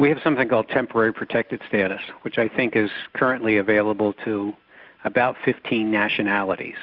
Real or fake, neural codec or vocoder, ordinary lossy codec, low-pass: real; none; Opus, 64 kbps; 5.4 kHz